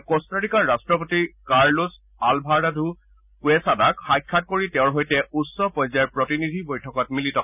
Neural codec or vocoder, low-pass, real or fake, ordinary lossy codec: none; 3.6 kHz; real; none